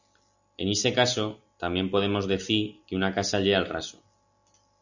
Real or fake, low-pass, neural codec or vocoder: real; 7.2 kHz; none